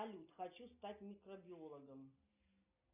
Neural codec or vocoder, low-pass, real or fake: none; 3.6 kHz; real